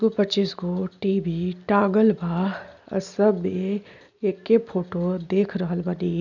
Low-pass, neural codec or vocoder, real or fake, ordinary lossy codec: 7.2 kHz; none; real; none